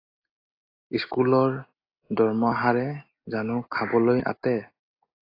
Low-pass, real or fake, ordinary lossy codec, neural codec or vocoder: 5.4 kHz; real; AAC, 24 kbps; none